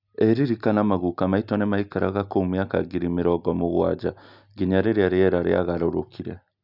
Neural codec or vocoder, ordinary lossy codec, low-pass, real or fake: none; none; 5.4 kHz; real